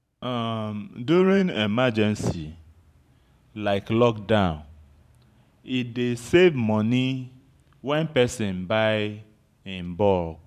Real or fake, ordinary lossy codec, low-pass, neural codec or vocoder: fake; none; 14.4 kHz; vocoder, 44.1 kHz, 128 mel bands every 512 samples, BigVGAN v2